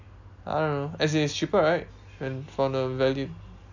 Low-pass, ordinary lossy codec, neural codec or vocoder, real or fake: 7.2 kHz; none; none; real